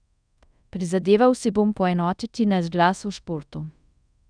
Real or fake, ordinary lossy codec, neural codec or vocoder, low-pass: fake; none; codec, 24 kHz, 0.5 kbps, DualCodec; 9.9 kHz